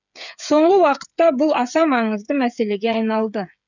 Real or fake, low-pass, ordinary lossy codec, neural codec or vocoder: fake; 7.2 kHz; none; codec, 16 kHz, 8 kbps, FreqCodec, smaller model